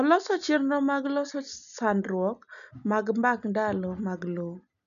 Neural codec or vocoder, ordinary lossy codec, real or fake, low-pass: none; none; real; 7.2 kHz